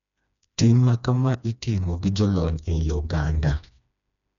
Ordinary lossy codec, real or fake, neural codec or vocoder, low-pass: none; fake; codec, 16 kHz, 2 kbps, FreqCodec, smaller model; 7.2 kHz